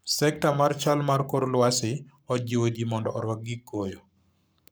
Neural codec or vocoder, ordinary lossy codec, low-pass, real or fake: codec, 44.1 kHz, 7.8 kbps, Pupu-Codec; none; none; fake